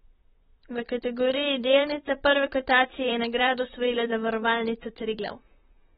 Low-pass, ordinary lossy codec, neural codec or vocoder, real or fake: 19.8 kHz; AAC, 16 kbps; vocoder, 44.1 kHz, 128 mel bands, Pupu-Vocoder; fake